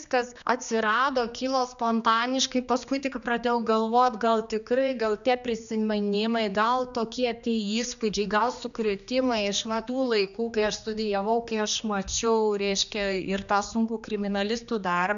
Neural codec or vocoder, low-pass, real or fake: codec, 16 kHz, 2 kbps, X-Codec, HuBERT features, trained on general audio; 7.2 kHz; fake